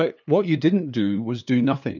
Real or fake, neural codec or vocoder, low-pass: fake; codec, 16 kHz, 2 kbps, FunCodec, trained on LibriTTS, 25 frames a second; 7.2 kHz